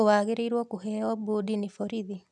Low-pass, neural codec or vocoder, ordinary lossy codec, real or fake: none; none; none; real